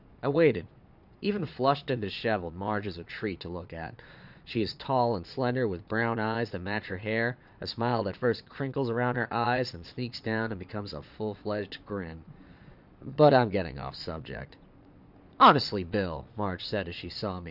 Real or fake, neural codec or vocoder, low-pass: fake; vocoder, 22.05 kHz, 80 mel bands, Vocos; 5.4 kHz